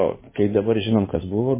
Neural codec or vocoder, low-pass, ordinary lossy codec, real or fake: codec, 16 kHz in and 24 kHz out, 2.2 kbps, FireRedTTS-2 codec; 3.6 kHz; MP3, 16 kbps; fake